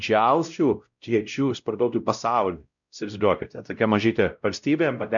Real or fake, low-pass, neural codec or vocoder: fake; 7.2 kHz; codec, 16 kHz, 0.5 kbps, X-Codec, WavLM features, trained on Multilingual LibriSpeech